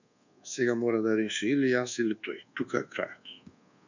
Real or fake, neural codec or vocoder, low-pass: fake; codec, 24 kHz, 1.2 kbps, DualCodec; 7.2 kHz